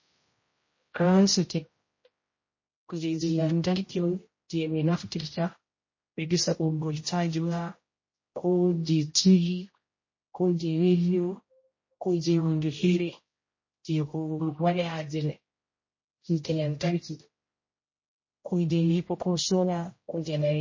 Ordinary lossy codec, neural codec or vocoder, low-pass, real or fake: MP3, 32 kbps; codec, 16 kHz, 0.5 kbps, X-Codec, HuBERT features, trained on general audio; 7.2 kHz; fake